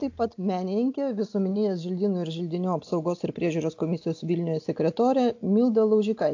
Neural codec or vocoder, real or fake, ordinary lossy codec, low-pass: none; real; AAC, 48 kbps; 7.2 kHz